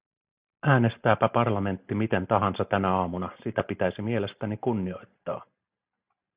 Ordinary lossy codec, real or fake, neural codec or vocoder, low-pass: Opus, 64 kbps; real; none; 3.6 kHz